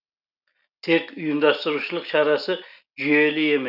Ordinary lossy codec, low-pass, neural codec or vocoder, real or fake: none; 5.4 kHz; none; real